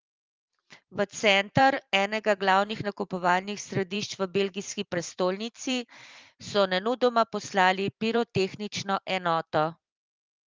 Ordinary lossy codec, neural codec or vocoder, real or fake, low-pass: Opus, 32 kbps; vocoder, 44.1 kHz, 128 mel bands every 512 samples, BigVGAN v2; fake; 7.2 kHz